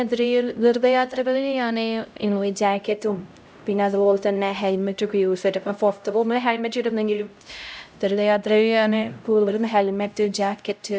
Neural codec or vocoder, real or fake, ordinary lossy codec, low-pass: codec, 16 kHz, 0.5 kbps, X-Codec, HuBERT features, trained on LibriSpeech; fake; none; none